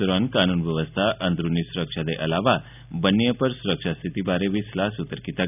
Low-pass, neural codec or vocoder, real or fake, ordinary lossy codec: 3.6 kHz; none; real; none